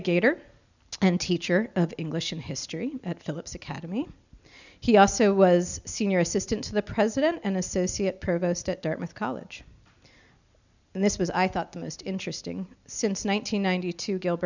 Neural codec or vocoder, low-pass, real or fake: none; 7.2 kHz; real